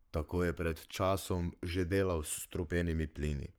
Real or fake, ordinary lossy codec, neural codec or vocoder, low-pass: fake; none; codec, 44.1 kHz, 7.8 kbps, Pupu-Codec; none